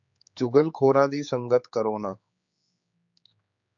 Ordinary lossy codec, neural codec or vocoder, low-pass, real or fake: AAC, 64 kbps; codec, 16 kHz, 4 kbps, X-Codec, HuBERT features, trained on general audio; 7.2 kHz; fake